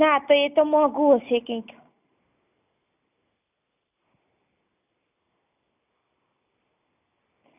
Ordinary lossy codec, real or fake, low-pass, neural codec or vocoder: none; real; 3.6 kHz; none